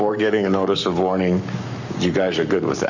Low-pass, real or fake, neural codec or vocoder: 7.2 kHz; fake; codec, 44.1 kHz, 7.8 kbps, Pupu-Codec